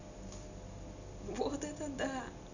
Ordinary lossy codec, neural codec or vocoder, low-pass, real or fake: none; none; 7.2 kHz; real